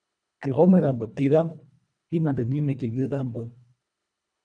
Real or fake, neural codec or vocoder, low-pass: fake; codec, 24 kHz, 1.5 kbps, HILCodec; 9.9 kHz